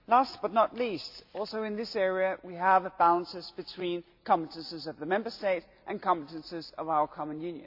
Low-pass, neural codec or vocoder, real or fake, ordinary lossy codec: 5.4 kHz; none; real; AAC, 48 kbps